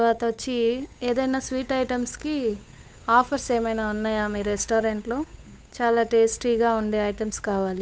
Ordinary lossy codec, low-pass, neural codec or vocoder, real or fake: none; none; codec, 16 kHz, 8 kbps, FunCodec, trained on Chinese and English, 25 frames a second; fake